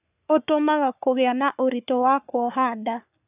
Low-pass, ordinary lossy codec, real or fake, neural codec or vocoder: 3.6 kHz; none; fake; codec, 44.1 kHz, 3.4 kbps, Pupu-Codec